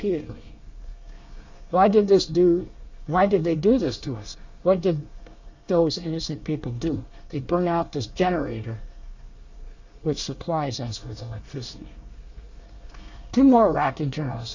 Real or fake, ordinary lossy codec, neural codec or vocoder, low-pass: fake; Opus, 64 kbps; codec, 24 kHz, 1 kbps, SNAC; 7.2 kHz